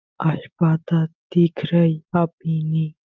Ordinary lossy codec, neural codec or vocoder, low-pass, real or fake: Opus, 32 kbps; none; 7.2 kHz; real